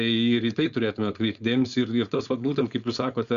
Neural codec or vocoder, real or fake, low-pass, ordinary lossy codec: codec, 16 kHz, 4.8 kbps, FACodec; fake; 7.2 kHz; Opus, 64 kbps